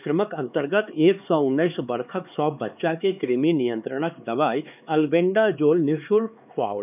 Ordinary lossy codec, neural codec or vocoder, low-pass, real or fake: none; codec, 16 kHz, 4 kbps, X-Codec, HuBERT features, trained on LibriSpeech; 3.6 kHz; fake